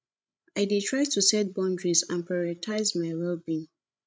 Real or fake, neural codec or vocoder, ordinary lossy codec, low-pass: fake; codec, 16 kHz, 16 kbps, FreqCodec, larger model; none; none